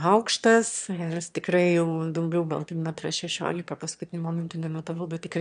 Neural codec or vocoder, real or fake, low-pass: autoencoder, 22.05 kHz, a latent of 192 numbers a frame, VITS, trained on one speaker; fake; 9.9 kHz